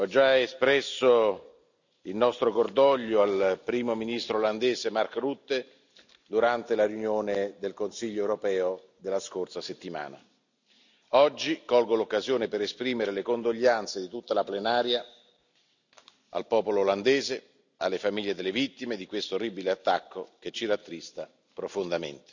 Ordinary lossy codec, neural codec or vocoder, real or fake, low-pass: none; none; real; 7.2 kHz